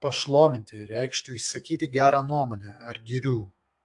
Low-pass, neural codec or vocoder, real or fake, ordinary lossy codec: 10.8 kHz; codec, 32 kHz, 1.9 kbps, SNAC; fake; AAC, 64 kbps